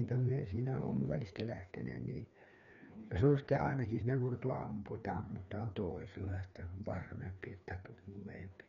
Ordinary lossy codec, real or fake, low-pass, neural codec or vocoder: none; fake; 7.2 kHz; codec, 16 kHz, 2 kbps, FreqCodec, larger model